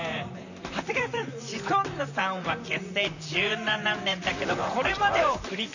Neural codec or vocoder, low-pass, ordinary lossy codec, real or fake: vocoder, 44.1 kHz, 128 mel bands, Pupu-Vocoder; 7.2 kHz; none; fake